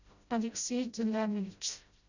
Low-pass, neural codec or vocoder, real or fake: 7.2 kHz; codec, 16 kHz, 0.5 kbps, FreqCodec, smaller model; fake